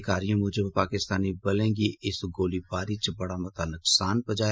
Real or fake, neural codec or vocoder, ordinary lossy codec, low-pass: real; none; none; none